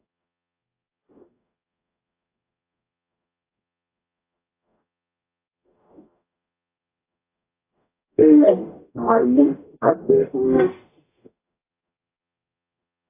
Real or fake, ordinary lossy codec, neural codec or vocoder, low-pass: fake; AAC, 24 kbps; codec, 44.1 kHz, 0.9 kbps, DAC; 3.6 kHz